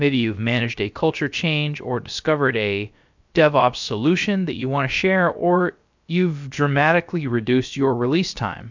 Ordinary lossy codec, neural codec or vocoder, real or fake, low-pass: MP3, 64 kbps; codec, 16 kHz, about 1 kbps, DyCAST, with the encoder's durations; fake; 7.2 kHz